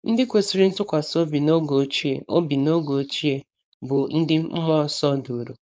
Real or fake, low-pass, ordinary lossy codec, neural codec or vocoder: fake; none; none; codec, 16 kHz, 4.8 kbps, FACodec